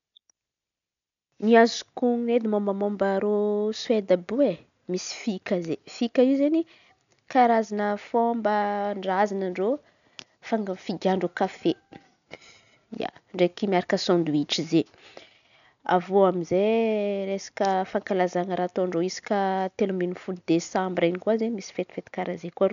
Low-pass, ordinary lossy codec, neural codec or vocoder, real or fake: 7.2 kHz; none; none; real